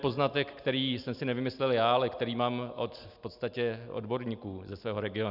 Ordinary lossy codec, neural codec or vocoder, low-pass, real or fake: Opus, 64 kbps; none; 5.4 kHz; real